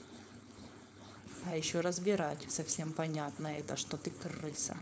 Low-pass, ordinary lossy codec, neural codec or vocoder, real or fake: none; none; codec, 16 kHz, 4.8 kbps, FACodec; fake